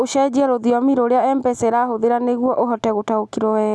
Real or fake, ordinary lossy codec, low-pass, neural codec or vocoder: real; none; none; none